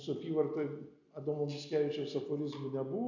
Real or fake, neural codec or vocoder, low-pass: real; none; 7.2 kHz